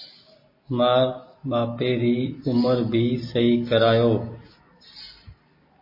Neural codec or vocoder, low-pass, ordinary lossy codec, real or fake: none; 5.4 kHz; MP3, 24 kbps; real